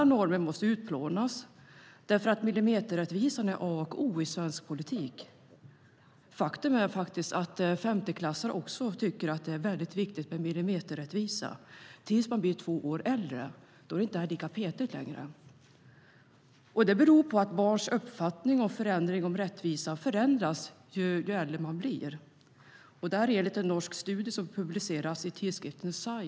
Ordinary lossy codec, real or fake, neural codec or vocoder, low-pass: none; real; none; none